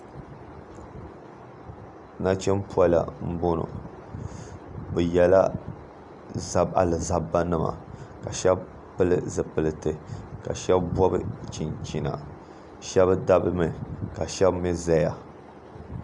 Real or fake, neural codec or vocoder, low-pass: real; none; 10.8 kHz